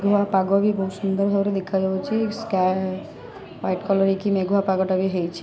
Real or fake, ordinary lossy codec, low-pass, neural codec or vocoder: real; none; none; none